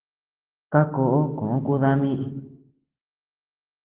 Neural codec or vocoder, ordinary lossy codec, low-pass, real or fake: none; Opus, 16 kbps; 3.6 kHz; real